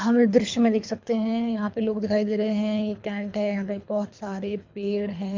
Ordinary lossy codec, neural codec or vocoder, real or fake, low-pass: MP3, 64 kbps; codec, 24 kHz, 3 kbps, HILCodec; fake; 7.2 kHz